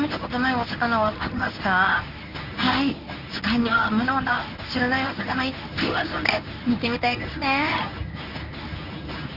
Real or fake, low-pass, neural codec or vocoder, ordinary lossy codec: fake; 5.4 kHz; codec, 24 kHz, 0.9 kbps, WavTokenizer, medium speech release version 1; none